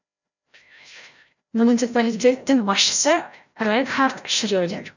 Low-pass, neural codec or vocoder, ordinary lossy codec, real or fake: 7.2 kHz; codec, 16 kHz, 0.5 kbps, FreqCodec, larger model; none; fake